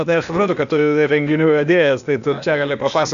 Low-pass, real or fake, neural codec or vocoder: 7.2 kHz; fake; codec, 16 kHz, 0.8 kbps, ZipCodec